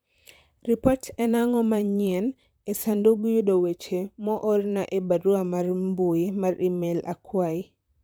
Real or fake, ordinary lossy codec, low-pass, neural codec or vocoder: fake; none; none; vocoder, 44.1 kHz, 128 mel bands, Pupu-Vocoder